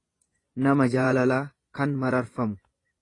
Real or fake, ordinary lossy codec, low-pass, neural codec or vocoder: fake; AAC, 32 kbps; 10.8 kHz; vocoder, 24 kHz, 100 mel bands, Vocos